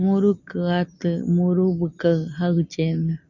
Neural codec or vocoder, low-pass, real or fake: none; 7.2 kHz; real